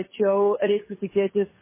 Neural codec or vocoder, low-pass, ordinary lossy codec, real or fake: codec, 16 kHz, 2 kbps, FunCodec, trained on Chinese and English, 25 frames a second; 3.6 kHz; MP3, 16 kbps; fake